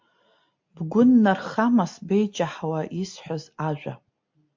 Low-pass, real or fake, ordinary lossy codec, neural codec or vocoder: 7.2 kHz; real; MP3, 48 kbps; none